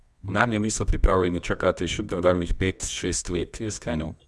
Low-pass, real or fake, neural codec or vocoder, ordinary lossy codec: none; fake; codec, 24 kHz, 0.9 kbps, WavTokenizer, medium music audio release; none